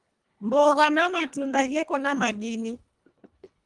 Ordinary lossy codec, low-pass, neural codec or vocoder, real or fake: Opus, 24 kbps; 10.8 kHz; codec, 24 kHz, 1.5 kbps, HILCodec; fake